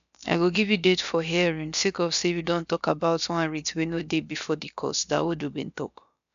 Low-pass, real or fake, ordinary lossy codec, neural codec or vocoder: 7.2 kHz; fake; AAC, 96 kbps; codec, 16 kHz, about 1 kbps, DyCAST, with the encoder's durations